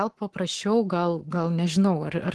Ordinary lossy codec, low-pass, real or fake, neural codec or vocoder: Opus, 16 kbps; 10.8 kHz; fake; codec, 44.1 kHz, 7.8 kbps, Pupu-Codec